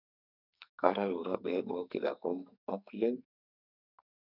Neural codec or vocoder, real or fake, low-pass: codec, 44.1 kHz, 2.6 kbps, SNAC; fake; 5.4 kHz